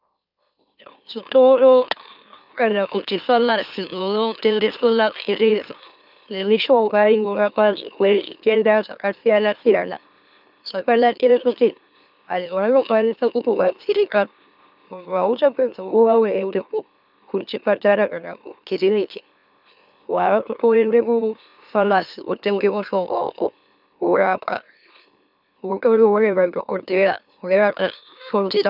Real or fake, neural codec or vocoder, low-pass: fake; autoencoder, 44.1 kHz, a latent of 192 numbers a frame, MeloTTS; 5.4 kHz